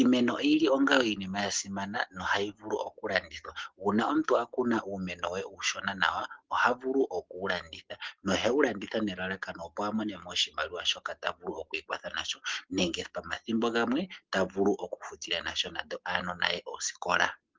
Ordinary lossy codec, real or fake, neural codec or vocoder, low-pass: Opus, 16 kbps; real; none; 7.2 kHz